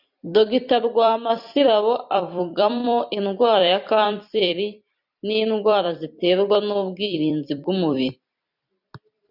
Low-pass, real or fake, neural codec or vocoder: 5.4 kHz; fake; vocoder, 44.1 kHz, 128 mel bands every 256 samples, BigVGAN v2